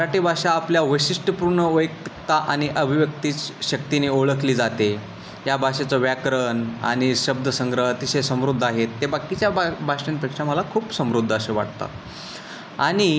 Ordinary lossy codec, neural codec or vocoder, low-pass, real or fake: none; none; none; real